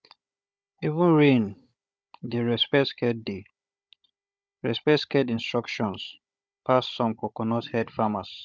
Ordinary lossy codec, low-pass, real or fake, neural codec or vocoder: none; none; fake; codec, 16 kHz, 16 kbps, FunCodec, trained on Chinese and English, 50 frames a second